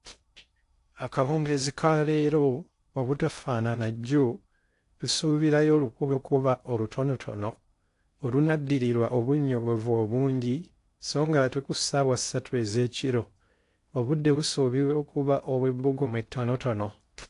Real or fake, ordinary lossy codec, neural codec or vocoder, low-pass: fake; AAC, 48 kbps; codec, 16 kHz in and 24 kHz out, 0.6 kbps, FocalCodec, streaming, 2048 codes; 10.8 kHz